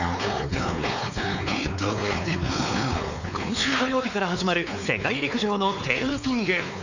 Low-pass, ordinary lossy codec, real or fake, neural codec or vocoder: 7.2 kHz; none; fake; codec, 16 kHz, 4 kbps, X-Codec, WavLM features, trained on Multilingual LibriSpeech